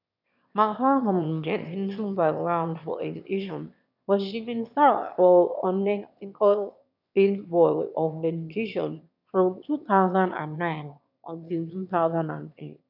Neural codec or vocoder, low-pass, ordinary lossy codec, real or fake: autoencoder, 22.05 kHz, a latent of 192 numbers a frame, VITS, trained on one speaker; 5.4 kHz; none; fake